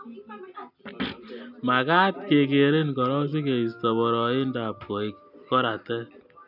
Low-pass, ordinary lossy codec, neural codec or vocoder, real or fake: 5.4 kHz; none; none; real